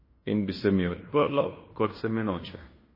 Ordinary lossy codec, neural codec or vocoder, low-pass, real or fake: MP3, 24 kbps; codec, 16 kHz in and 24 kHz out, 0.9 kbps, LongCat-Audio-Codec, fine tuned four codebook decoder; 5.4 kHz; fake